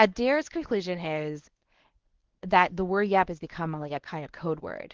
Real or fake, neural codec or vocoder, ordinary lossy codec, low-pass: fake; codec, 24 kHz, 0.9 kbps, WavTokenizer, medium speech release version 1; Opus, 16 kbps; 7.2 kHz